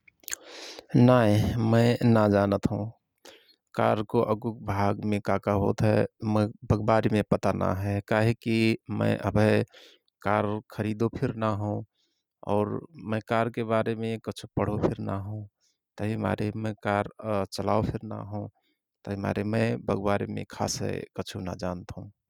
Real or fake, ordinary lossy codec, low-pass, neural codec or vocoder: real; none; 19.8 kHz; none